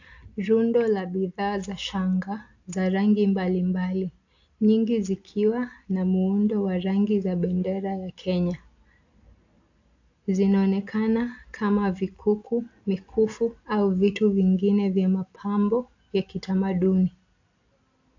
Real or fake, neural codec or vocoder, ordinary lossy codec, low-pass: real; none; AAC, 48 kbps; 7.2 kHz